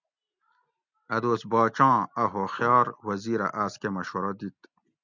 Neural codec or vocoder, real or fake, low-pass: none; real; 7.2 kHz